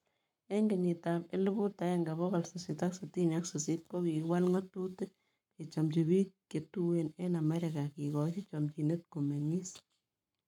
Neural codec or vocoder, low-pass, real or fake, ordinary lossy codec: codec, 44.1 kHz, 7.8 kbps, Pupu-Codec; 19.8 kHz; fake; none